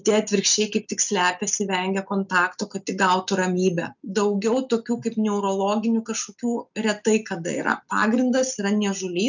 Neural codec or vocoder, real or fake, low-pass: none; real; 7.2 kHz